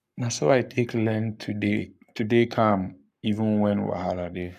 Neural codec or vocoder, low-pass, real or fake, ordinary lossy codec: codec, 44.1 kHz, 7.8 kbps, Pupu-Codec; 14.4 kHz; fake; AAC, 96 kbps